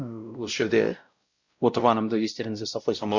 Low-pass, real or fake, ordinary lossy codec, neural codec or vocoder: 7.2 kHz; fake; Opus, 64 kbps; codec, 16 kHz, 0.5 kbps, X-Codec, WavLM features, trained on Multilingual LibriSpeech